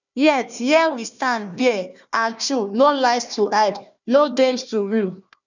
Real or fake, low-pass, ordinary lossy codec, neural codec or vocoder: fake; 7.2 kHz; none; codec, 16 kHz, 1 kbps, FunCodec, trained on Chinese and English, 50 frames a second